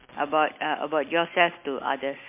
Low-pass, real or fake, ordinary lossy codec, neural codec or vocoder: 3.6 kHz; real; MP3, 24 kbps; none